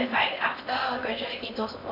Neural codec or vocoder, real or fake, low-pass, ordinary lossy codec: codec, 16 kHz in and 24 kHz out, 0.6 kbps, FocalCodec, streaming, 4096 codes; fake; 5.4 kHz; none